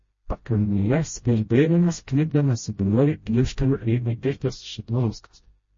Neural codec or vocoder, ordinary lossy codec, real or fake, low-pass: codec, 16 kHz, 0.5 kbps, FreqCodec, smaller model; MP3, 32 kbps; fake; 7.2 kHz